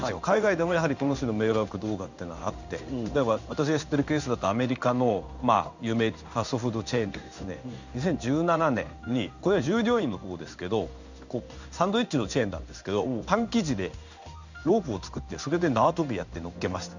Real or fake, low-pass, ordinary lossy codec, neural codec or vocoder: fake; 7.2 kHz; none; codec, 16 kHz in and 24 kHz out, 1 kbps, XY-Tokenizer